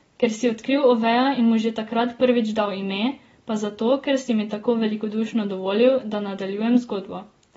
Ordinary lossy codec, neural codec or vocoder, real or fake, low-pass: AAC, 24 kbps; none; real; 19.8 kHz